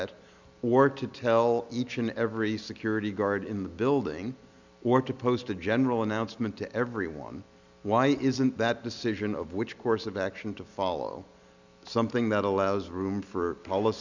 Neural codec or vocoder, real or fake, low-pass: none; real; 7.2 kHz